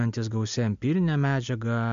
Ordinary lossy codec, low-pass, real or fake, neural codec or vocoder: MP3, 64 kbps; 7.2 kHz; real; none